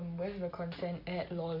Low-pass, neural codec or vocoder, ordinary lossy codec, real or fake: 5.4 kHz; none; MP3, 32 kbps; real